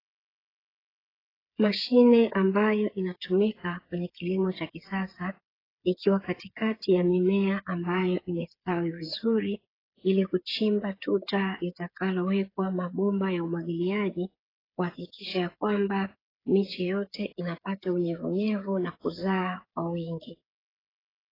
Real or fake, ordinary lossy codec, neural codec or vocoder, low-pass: fake; AAC, 24 kbps; codec, 16 kHz, 8 kbps, FreqCodec, smaller model; 5.4 kHz